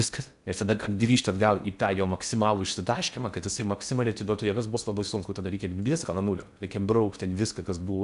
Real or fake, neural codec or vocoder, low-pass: fake; codec, 16 kHz in and 24 kHz out, 0.6 kbps, FocalCodec, streaming, 4096 codes; 10.8 kHz